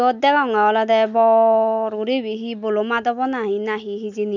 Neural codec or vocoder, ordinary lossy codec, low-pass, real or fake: none; none; 7.2 kHz; real